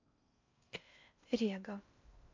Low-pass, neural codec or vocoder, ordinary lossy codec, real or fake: 7.2 kHz; codec, 16 kHz in and 24 kHz out, 0.6 kbps, FocalCodec, streaming, 4096 codes; MP3, 64 kbps; fake